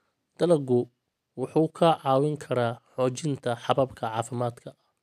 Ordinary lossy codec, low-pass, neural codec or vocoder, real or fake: none; 14.4 kHz; none; real